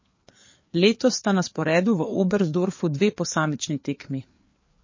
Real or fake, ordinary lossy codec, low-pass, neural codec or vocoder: fake; MP3, 32 kbps; 7.2 kHz; codec, 16 kHz, 4 kbps, FreqCodec, larger model